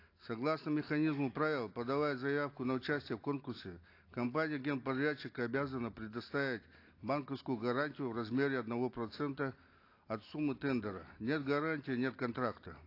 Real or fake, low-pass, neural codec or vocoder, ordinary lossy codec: real; 5.4 kHz; none; AAC, 32 kbps